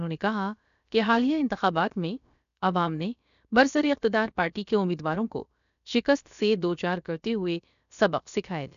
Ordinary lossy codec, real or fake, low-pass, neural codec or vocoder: none; fake; 7.2 kHz; codec, 16 kHz, about 1 kbps, DyCAST, with the encoder's durations